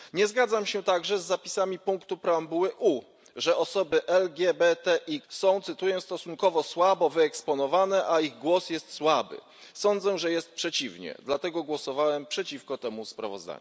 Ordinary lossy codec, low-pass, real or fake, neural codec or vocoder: none; none; real; none